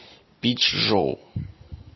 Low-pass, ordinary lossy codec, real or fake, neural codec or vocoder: 7.2 kHz; MP3, 24 kbps; real; none